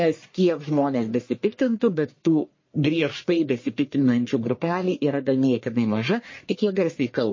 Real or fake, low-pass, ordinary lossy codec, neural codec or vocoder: fake; 7.2 kHz; MP3, 32 kbps; codec, 44.1 kHz, 1.7 kbps, Pupu-Codec